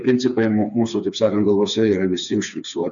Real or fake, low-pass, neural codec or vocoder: fake; 7.2 kHz; codec, 16 kHz, 4 kbps, FreqCodec, smaller model